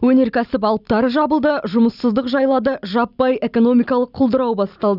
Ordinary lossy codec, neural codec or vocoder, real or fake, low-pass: none; none; real; 5.4 kHz